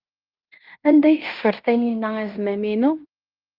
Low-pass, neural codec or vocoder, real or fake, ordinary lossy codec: 5.4 kHz; codec, 16 kHz in and 24 kHz out, 0.9 kbps, LongCat-Audio-Codec, fine tuned four codebook decoder; fake; Opus, 24 kbps